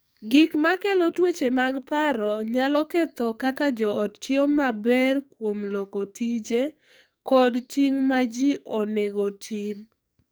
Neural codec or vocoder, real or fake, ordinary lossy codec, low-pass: codec, 44.1 kHz, 2.6 kbps, SNAC; fake; none; none